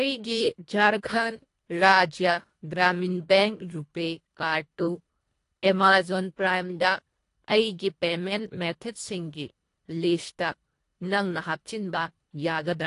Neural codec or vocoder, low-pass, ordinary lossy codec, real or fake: codec, 24 kHz, 1.5 kbps, HILCodec; 10.8 kHz; AAC, 48 kbps; fake